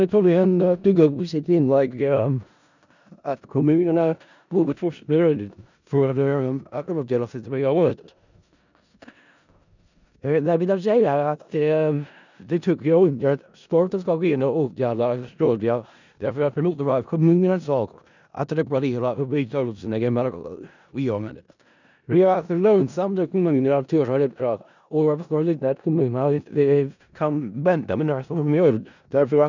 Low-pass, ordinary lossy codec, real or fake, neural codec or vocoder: 7.2 kHz; none; fake; codec, 16 kHz in and 24 kHz out, 0.4 kbps, LongCat-Audio-Codec, four codebook decoder